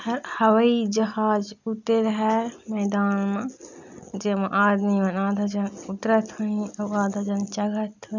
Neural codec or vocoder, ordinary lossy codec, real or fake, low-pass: none; none; real; 7.2 kHz